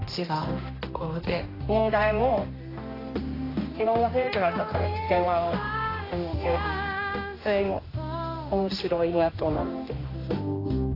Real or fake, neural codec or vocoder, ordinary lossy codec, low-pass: fake; codec, 16 kHz, 1 kbps, X-Codec, HuBERT features, trained on general audio; AAC, 24 kbps; 5.4 kHz